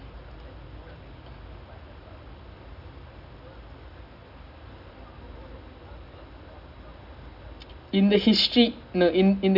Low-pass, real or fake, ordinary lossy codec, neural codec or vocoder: 5.4 kHz; real; none; none